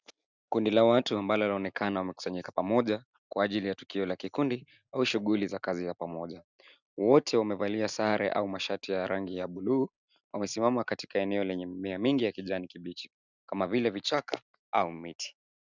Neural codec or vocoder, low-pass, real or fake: none; 7.2 kHz; real